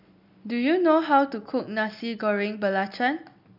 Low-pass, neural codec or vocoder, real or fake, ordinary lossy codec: 5.4 kHz; none; real; none